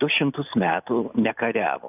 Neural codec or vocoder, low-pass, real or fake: none; 3.6 kHz; real